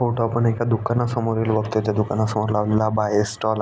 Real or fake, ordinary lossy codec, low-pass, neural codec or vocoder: real; none; none; none